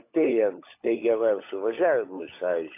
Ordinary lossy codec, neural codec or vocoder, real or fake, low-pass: AAC, 24 kbps; codec, 16 kHz, 16 kbps, FunCodec, trained on LibriTTS, 50 frames a second; fake; 3.6 kHz